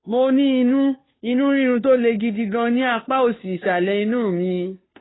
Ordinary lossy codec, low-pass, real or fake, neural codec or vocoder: AAC, 16 kbps; 7.2 kHz; fake; codec, 16 kHz, 2 kbps, FunCodec, trained on Chinese and English, 25 frames a second